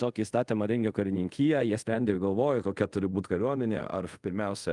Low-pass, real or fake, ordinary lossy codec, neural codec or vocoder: 10.8 kHz; fake; Opus, 32 kbps; codec, 24 kHz, 0.5 kbps, DualCodec